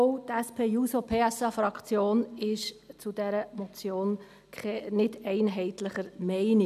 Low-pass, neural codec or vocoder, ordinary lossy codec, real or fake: 14.4 kHz; none; none; real